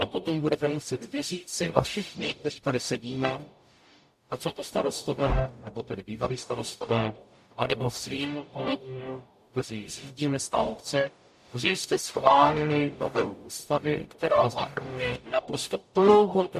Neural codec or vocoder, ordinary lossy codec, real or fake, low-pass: codec, 44.1 kHz, 0.9 kbps, DAC; MP3, 64 kbps; fake; 14.4 kHz